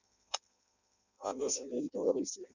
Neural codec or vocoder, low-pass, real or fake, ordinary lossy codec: codec, 16 kHz in and 24 kHz out, 0.6 kbps, FireRedTTS-2 codec; 7.2 kHz; fake; none